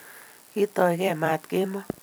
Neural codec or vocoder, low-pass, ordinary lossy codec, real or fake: vocoder, 44.1 kHz, 128 mel bands every 256 samples, BigVGAN v2; none; none; fake